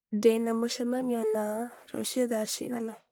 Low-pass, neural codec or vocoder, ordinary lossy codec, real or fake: none; codec, 44.1 kHz, 1.7 kbps, Pupu-Codec; none; fake